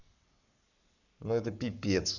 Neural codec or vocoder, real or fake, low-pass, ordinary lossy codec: codec, 44.1 kHz, 7.8 kbps, Pupu-Codec; fake; 7.2 kHz; none